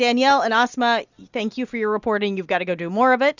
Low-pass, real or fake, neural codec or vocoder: 7.2 kHz; real; none